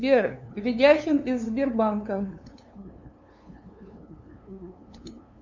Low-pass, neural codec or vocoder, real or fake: 7.2 kHz; codec, 16 kHz, 2 kbps, FunCodec, trained on LibriTTS, 25 frames a second; fake